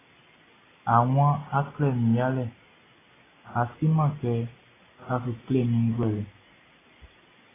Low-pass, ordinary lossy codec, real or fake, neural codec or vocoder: 3.6 kHz; AAC, 16 kbps; real; none